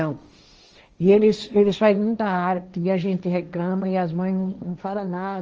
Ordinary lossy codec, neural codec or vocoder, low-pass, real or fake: Opus, 24 kbps; codec, 16 kHz, 1.1 kbps, Voila-Tokenizer; 7.2 kHz; fake